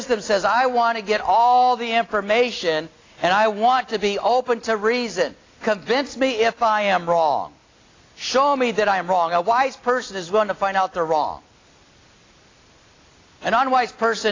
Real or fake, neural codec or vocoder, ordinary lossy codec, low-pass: real; none; AAC, 32 kbps; 7.2 kHz